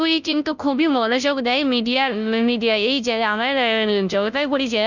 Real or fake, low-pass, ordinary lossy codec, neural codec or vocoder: fake; 7.2 kHz; none; codec, 16 kHz, 0.5 kbps, FunCodec, trained on Chinese and English, 25 frames a second